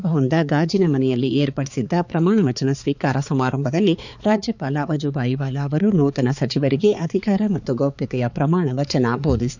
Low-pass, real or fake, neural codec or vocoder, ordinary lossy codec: 7.2 kHz; fake; codec, 16 kHz, 4 kbps, X-Codec, HuBERT features, trained on balanced general audio; none